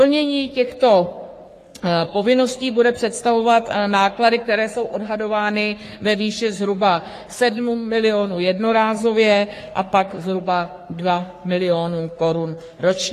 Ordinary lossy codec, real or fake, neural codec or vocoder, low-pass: AAC, 48 kbps; fake; codec, 44.1 kHz, 3.4 kbps, Pupu-Codec; 14.4 kHz